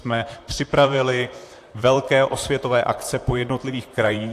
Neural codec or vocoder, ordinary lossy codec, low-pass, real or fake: vocoder, 44.1 kHz, 128 mel bands, Pupu-Vocoder; AAC, 64 kbps; 14.4 kHz; fake